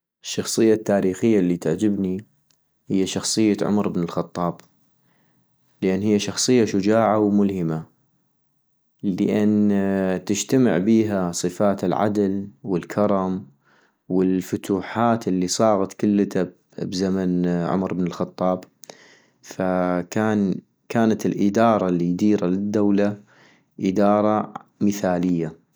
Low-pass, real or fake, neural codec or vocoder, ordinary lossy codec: none; real; none; none